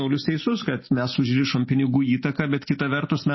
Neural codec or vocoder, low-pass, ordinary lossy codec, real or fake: none; 7.2 kHz; MP3, 24 kbps; real